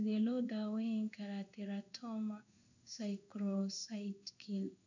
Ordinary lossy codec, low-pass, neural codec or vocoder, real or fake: none; 7.2 kHz; codec, 16 kHz in and 24 kHz out, 1 kbps, XY-Tokenizer; fake